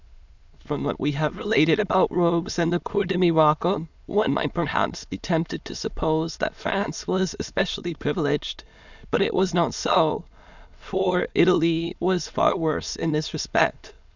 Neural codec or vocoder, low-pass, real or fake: autoencoder, 22.05 kHz, a latent of 192 numbers a frame, VITS, trained on many speakers; 7.2 kHz; fake